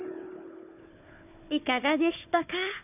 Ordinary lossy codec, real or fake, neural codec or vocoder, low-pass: none; fake; codec, 16 kHz, 4 kbps, FunCodec, trained on Chinese and English, 50 frames a second; 3.6 kHz